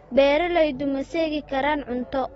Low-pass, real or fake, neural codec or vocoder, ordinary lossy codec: 19.8 kHz; real; none; AAC, 24 kbps